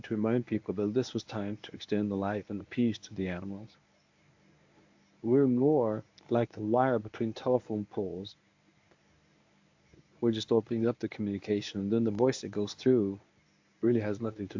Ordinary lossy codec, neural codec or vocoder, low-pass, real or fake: AAC, 48 kbps; codec, 24 kHz, 0.9 kbps, WavTokenizer, medium speech release version 1; 7.2 kHz; fake